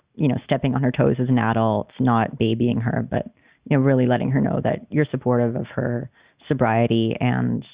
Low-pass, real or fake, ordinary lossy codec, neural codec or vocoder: 3.6 kHz; real; Opus, 64 kbps; none